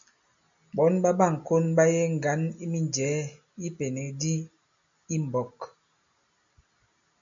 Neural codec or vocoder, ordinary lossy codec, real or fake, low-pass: none; MP3, 64 kbps; real; 7.2 kHz